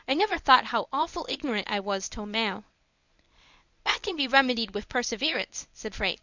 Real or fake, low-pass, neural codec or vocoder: fake; 7.2 kHz; codec, 24 kHz, 0.9 kbps, WavTokenizer, medium speech release version 1